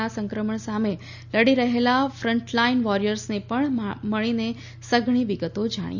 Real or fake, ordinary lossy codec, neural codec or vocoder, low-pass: real; none; none; 7.2 kHz